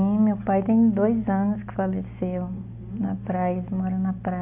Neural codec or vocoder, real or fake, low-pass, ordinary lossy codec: none; real; 3.6 kHz; AAC, 32 kbps